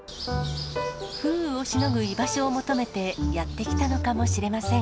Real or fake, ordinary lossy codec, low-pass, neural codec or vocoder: real; none; none; none